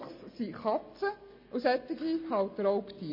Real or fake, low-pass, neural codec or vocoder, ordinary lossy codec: real; 5.4 kHz; none; MP3, 24 kbps